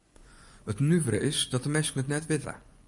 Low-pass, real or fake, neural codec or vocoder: 10.8 kHz; fake; vocoder, 24 kHz, 100 mel bands, Vocos